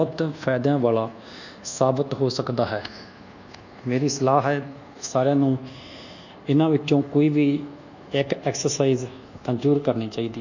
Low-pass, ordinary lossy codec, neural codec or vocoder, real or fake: 7.2 kHz; none; codec, 24 kHz, 1.2 kbps, DualCodec; fake